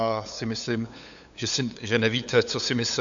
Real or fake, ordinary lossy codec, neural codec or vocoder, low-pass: fake; AAC, 64 kbps; codec, 16 kHz, 8 kbps, FunCodec, trained on LibriTTS, 25 frames a second; 7.2 kHz